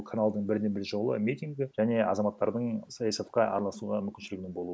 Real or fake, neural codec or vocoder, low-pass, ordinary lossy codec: real; none; none; none